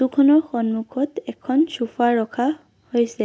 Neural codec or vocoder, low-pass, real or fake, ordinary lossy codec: none; none; real; none